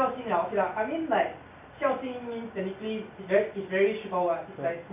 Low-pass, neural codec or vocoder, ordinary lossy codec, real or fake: 3.6 kHz; none; MP3, 32 kbps; real